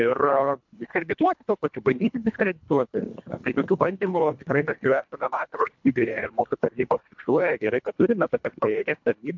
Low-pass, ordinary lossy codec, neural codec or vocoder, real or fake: 7.2 kHz; MP3, 64 kbps; codec, 24 kHz, 1.5 kbps, HILCodec; fake